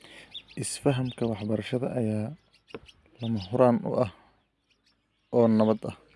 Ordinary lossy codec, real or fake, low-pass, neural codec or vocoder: none; real; none; none